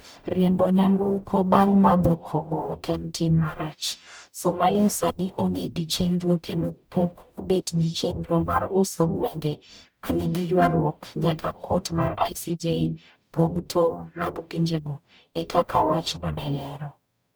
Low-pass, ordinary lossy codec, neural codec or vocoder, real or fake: none; none; codec, 44.1 kHz, 0.9 kbps, DAC; fake